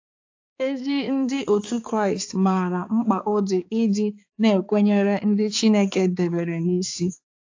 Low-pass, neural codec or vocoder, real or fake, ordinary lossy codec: 7.2 kHz; codec, 16 kHz, 4 kbps, X-Codec, HuBERT features, trained on balanced general audio; fake; AAC, 48 kbps